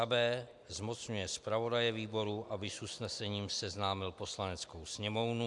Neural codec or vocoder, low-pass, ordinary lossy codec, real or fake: vocoder, 44.1 kHz, 128 mel bands every 256 samples, BigVGAN v2; 10.8 kHz; MP3, 96 kbps; fake